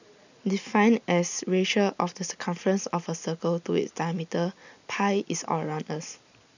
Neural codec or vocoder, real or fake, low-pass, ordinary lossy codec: none; real; 7.2 kHz; none